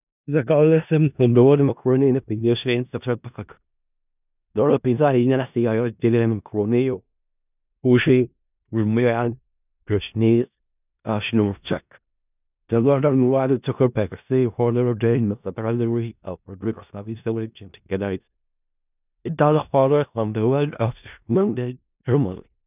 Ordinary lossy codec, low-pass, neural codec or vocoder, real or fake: none; 3.6 kHz; codec, 16 kHz in and 24 kHz out, 0.4 kbps, LongCat-Audio-Codec, four codebook decoder; fake